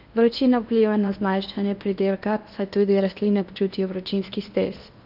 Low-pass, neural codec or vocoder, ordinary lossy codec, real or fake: 5.4 kHz; codec, 16 kHz in and 24 kHz out, 0.8 kbps, FocalCodec, streaming, 65536 codes; none; fake